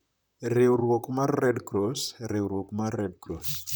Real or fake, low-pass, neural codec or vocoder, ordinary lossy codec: fake; none; vocoder, 44.1 kHz, 128 mel bands, Pupu-Vocoder; none